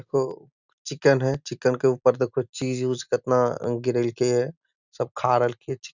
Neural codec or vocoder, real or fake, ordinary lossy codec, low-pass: none; real; none; 7.2 kHz